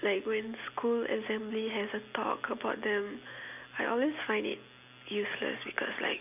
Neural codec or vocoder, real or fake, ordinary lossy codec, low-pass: none; real; none; 3.6 kHz